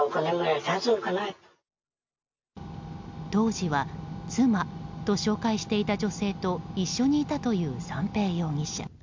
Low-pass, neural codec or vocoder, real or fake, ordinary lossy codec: 7.2 kHz; none; real; MP3, 64 kbps